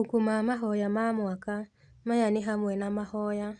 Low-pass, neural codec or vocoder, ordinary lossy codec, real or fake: 9.9 kHz; none; Opus, 64 kbps; real